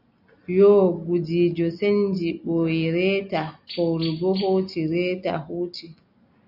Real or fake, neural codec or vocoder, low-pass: real; none; 5.4 kHz